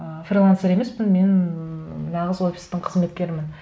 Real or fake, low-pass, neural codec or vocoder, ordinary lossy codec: real; none; none; none